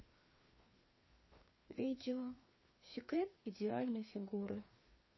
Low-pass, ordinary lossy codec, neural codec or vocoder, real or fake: 7.2 kHz; MP3, 24 kbps; codec, 16 kHz, 2 kbps, FreqCodec, larger model; fake